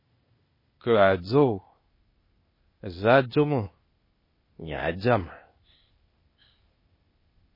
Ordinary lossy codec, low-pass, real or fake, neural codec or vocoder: MP3, 24 kbps; 5.4 kHz; fake; codec, 16 kHz, 0.8 kbps, ZipCodec